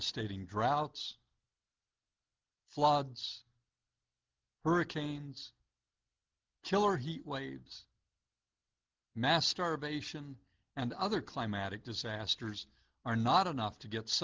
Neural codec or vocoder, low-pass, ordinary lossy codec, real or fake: none; 7.2 kHz; Opus, 32 kbps; real